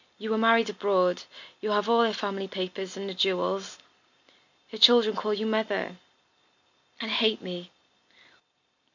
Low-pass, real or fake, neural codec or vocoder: 7.2 kHz; real; none